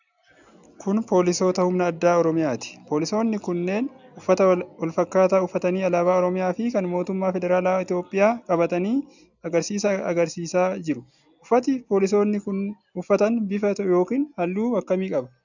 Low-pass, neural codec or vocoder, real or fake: 7.2 kHz; none; real